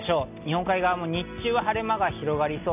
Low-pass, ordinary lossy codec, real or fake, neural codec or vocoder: 3.6 kHz; none; real; none